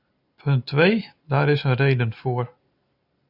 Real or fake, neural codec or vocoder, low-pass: real; none; 5.4 kHz